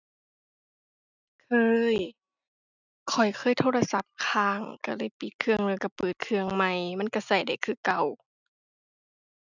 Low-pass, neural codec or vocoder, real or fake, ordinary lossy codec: 7.2 kHz; none; real; none